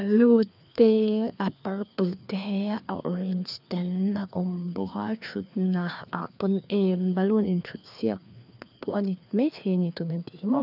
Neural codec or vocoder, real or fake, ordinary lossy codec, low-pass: codec, 16 kHz, 2 kbps, FreqCodec, larger model; fake; none; 5.4 kHz